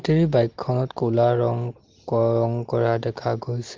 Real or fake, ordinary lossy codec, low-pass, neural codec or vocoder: real; Opus, 16 kbps; 7.2 kHz; none